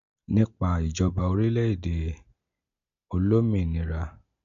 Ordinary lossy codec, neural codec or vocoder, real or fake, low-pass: none; none; real; 7.2 kHz